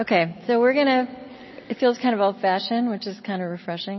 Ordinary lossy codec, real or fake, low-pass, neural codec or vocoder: MP3, 24 kbps; real; 7.2 kHz; none